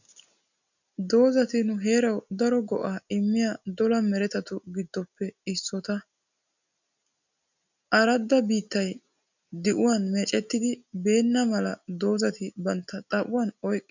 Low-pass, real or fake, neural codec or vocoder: 7.2 kHz; real; none